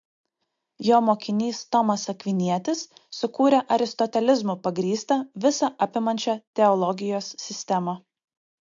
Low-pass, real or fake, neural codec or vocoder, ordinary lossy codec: 7.2 kHz; real; none; MP3, 48 kbps